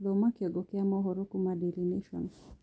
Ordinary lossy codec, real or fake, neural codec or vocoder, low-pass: none; real; none; none